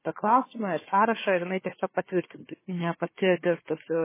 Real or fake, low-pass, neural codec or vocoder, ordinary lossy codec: fake; 3.6 kHz; codec, 16 kHz, 4 kbps, FunCodec, trained on Chinese and English, 50 frames a second; MP3, 16 kbps